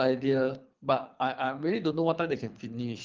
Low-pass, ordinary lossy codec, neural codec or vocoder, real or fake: 7.2 kHz; Opus, 32 kbps; codec, 24 kHz, 3 kbps, HILCodec; fake